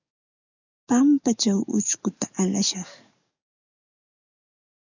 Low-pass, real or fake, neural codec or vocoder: 7.2 kHz; fake; codec, 44.1 kHz, 7.8 kbps, DAC